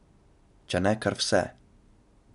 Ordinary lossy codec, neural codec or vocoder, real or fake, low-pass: none; none; real; 10.8 kHz